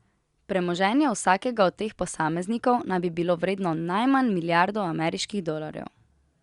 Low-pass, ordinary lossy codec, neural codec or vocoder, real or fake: 10.8 kHz; Opus, 64 kbps; none; real